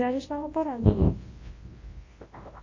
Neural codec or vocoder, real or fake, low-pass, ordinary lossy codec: codec, 24 kHz, 0.9 kbps, WavTokenizer, large speech release; fake; 7.2 kHz; MP3, 32 kbps